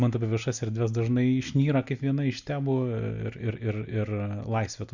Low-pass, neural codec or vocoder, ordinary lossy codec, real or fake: 7.2 kHz; none; Opus, 64 kbps; real